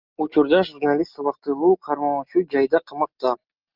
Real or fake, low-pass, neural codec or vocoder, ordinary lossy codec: real; 5.4 kHz; none; Opus, 24 kbps